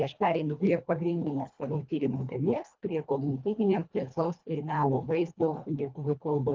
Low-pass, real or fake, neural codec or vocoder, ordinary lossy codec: 7.2 kHz; fake; codec, 24 kHz, 1.5 kbps, HILCodec; Opus, 32 kbps